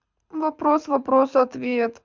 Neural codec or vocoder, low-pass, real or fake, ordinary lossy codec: codec, 24 kHz, 6 kbps, HILCodec; 7.2 kHz; fake; MP3, 64 kbps